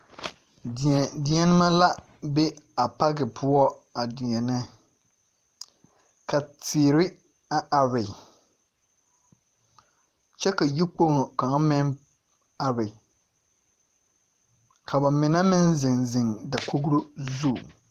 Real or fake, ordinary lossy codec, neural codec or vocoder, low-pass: fake; Opus, 24 kbps; vocoder, 44.1 kHz, 128 mel bands every 512 samples, BigVGAN v2; 14.4 kHz